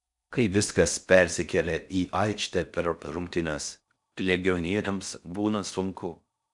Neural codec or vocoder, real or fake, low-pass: codec, 16 kHz in and 24 kHz out, 0.6 kbps, FocalCodec, streaming, 4096 codes; fake; 10.8 kHz